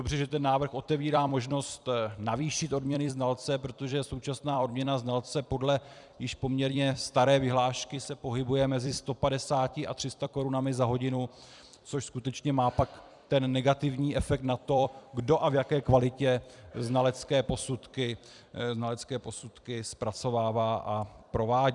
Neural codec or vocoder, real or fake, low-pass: vocoder, 44.1 kHz, 128 mel bands every 256 samples, BigVGAN v2; fake; 10.8 kHz